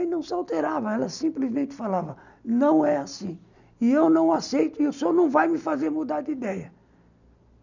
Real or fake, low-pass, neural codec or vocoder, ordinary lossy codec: real; 7.2 kHz; none; none